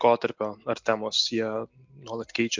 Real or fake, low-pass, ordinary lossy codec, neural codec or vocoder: real; 7.2 kHz; MP3, 64 kbps; none